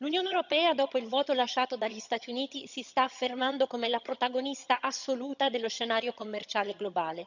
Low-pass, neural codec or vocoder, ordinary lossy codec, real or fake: 7.2 kHz; vocoder, 22.05 kHz, 80 mel bands, HiFi-GAN; none; fake